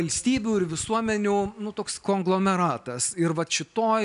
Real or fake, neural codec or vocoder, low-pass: real; none; 10.8 kHz